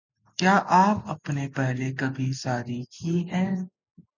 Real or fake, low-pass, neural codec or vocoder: real; 7.2 kHz; none